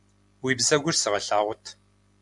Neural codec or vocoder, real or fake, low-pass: none; real; 10.8 kHz